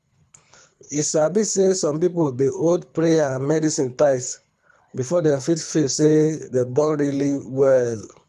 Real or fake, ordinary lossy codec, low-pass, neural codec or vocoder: fake; none; none; codec, 24 kHz, 3 kbps, HILCodec